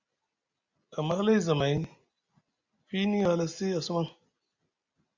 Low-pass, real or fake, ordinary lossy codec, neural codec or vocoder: 7.2 kHz; real; Opus, 64 kbps; none